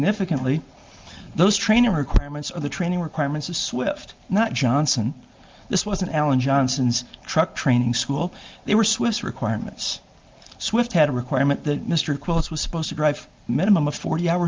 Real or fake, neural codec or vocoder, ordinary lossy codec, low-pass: real; none; Opus, 32 kbps; 7.2 kHz